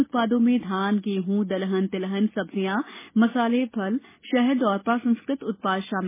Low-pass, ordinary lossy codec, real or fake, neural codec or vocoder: 3.6 kHz; MP3, 16 kbps; real; none